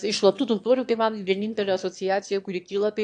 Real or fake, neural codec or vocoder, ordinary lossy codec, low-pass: fake; autoencoder, 22.05 kHz, a latent of 192 numbers a frame, VITS, trained on one speaker; AAC, 64 kbps; 9.9 kHz